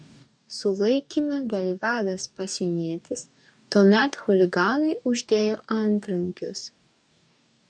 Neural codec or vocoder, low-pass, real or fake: codec, 44.1 kHz, 2.6 kbps, DAC; 9.9 kHz; fake